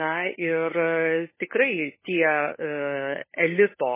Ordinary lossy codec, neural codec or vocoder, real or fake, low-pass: MP3, 16 kbps; codec, 16 kHz, 16 kbps, FunCodec, trained on LibriTTS, 50 frames a second; fake; 3.6 kHz